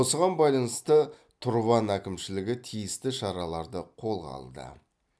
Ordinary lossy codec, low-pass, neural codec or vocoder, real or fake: none; none; none; real